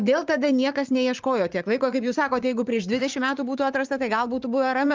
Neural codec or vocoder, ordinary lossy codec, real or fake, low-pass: vocoder, 44.1 kHz, 80 mel bands, Vocos; Opus, 32 kbps; fake; 7.2 kHz